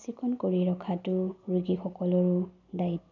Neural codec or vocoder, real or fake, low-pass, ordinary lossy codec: none; real; 7.2 kHz; none